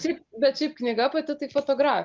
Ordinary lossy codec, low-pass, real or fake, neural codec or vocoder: Opus, 32 kbps; 7.2 kHz; real; none